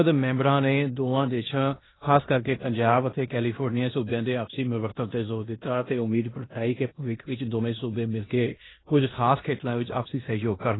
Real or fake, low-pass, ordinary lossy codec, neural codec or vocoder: fake; 7.2 kHz; AAC, 16 kbps; codec, 16 kHz in and 24 kHz out, 0.9 kbps, LongCat-Audio-Codec, four codebook decoder